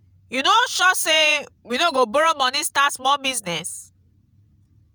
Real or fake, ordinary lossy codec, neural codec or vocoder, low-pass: fake; none; vocoder, 48 kHz, 128 mel bands, Vocos; none